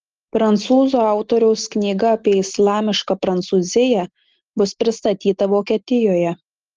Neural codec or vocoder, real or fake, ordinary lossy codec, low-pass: none; real; Opus, 16 kbps; 7.2 kHz